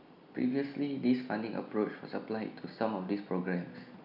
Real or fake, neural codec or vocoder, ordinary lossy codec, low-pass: real; none; none; 5.4 kHz